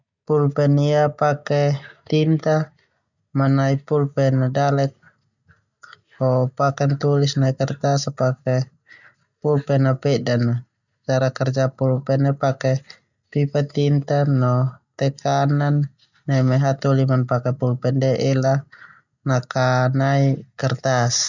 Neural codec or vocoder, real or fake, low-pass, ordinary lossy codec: none; real; 7.2 kHz; none